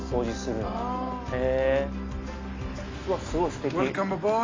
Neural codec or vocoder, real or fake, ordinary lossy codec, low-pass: none; real; AAC, 32 kbps; 7.2 kHz